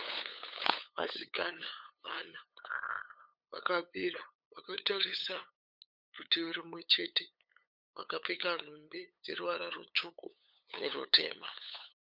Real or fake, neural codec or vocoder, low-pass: fake; codec, 16 kHz, 8 kbps, FunCodec, trained on LibriTTS, 25 frames a second; 5.4 kHz